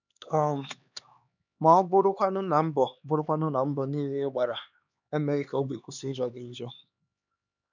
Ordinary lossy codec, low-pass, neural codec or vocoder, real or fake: none; 7.2 kHz; codec, 16 kHz, 2 kbps, X-Codec, HuBERT features, trained on LibriSpeech; fake